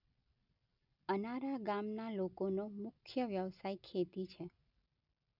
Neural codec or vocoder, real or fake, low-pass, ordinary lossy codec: none; real; 5.4 kHz; none